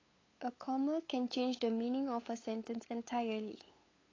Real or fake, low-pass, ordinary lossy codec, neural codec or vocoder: fake; 7.2 kHz; AAC, 32 kbps; codec, 16 kHz, 8 kbps, FunCodec, trained on LibriTTS, 25 frames a second